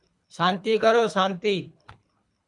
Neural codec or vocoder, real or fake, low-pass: codec, 24 kHz, 3 kbps, HILCodec; fake; 10.8 kHz